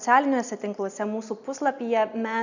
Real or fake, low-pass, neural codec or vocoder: real; 7.2 kHz; none